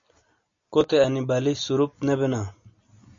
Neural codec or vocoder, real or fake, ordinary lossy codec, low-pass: none; real; MP3, 48 kbps; 7.2 kHz